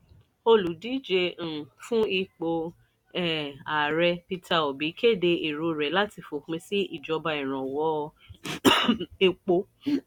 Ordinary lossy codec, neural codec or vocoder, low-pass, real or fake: none; none; 19.8 kHz; real